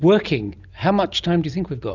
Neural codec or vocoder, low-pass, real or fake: none; 7.2 kHz; real